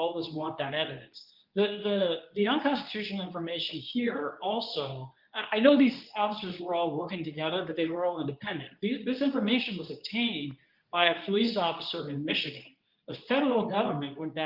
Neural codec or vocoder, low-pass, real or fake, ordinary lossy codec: codec, 24 kHz, 0.9 kbps, WavTokenizer, medium speech release version 2; 5.4 kHz; fake; Opus, 24 kbps